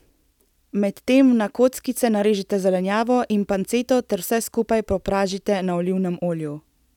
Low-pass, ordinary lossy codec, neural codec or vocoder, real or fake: 19.8 kHz; none; none; real